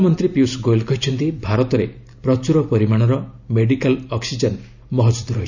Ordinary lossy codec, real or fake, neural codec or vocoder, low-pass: none; real; none; 7.2 kHz